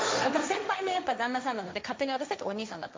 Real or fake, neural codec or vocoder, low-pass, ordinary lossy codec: fake; codec, 16 kHz, 1.1 kbps, Voila-Tokenizer; none; none